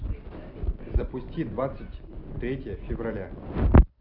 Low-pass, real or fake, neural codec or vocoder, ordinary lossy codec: 5.4 kHz; real; none; none